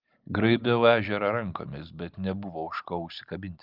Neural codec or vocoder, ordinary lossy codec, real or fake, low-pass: none; Opus, 24 kbps; real; 5.4 kHz